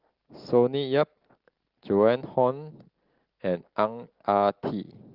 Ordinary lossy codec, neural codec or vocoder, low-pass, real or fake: Opus, 16 kbps; none; 5.4 kHz; real